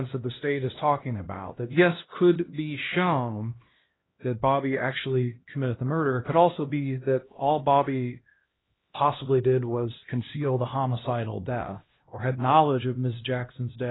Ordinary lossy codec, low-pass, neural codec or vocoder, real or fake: AAC, 16 kbps; 7.2 kHz; codec, 16 kHz, 1 kbps, X-Codec, HuBERT features, trained on LibriSpeech; fake